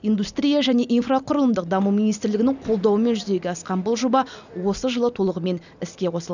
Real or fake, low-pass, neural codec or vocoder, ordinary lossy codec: real; 7.2 kHz; none; none